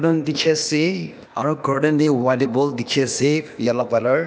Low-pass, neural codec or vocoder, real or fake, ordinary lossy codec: none; codec, 16 kHz, 0.8 kbps, ZipCodec; fake; none